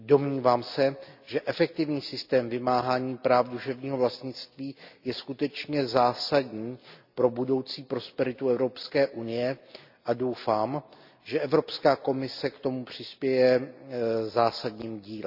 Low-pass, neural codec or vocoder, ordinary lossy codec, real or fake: 5.4 kHz; none; none; real